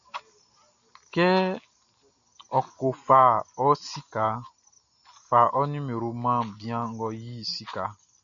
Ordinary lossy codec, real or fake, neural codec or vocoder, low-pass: MP3, 96 kbps; real; none; 7.2 kHz